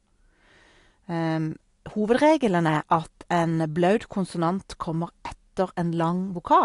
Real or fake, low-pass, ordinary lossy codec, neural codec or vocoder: real; 10.8 kHz; AAC, 48 kbps; none